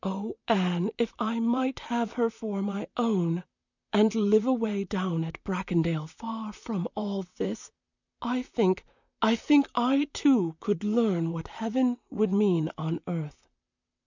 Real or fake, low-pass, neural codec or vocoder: fake; 7.2 kHz; vocoder, 44.1 kHz, 128 mel bands, Pupu-Vocoder